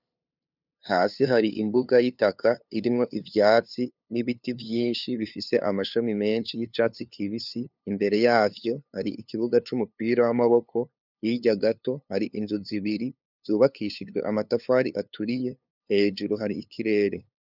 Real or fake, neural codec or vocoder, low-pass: fake; codec, 16 kHz, 2 kbps, FunCodec, trained on LibriTTS, 25 frames a second; 5.4 kHz